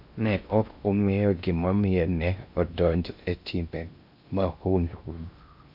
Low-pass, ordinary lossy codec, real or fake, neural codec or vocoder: 5.4 kHz; Opus, 64 kbps; fake; codec, 16 kHz in and 24 kHz out, 0.6 kbps, FocalCodec, streaming, 4096 codes